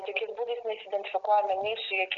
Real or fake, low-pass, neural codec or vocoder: real; 7.2 kHz; none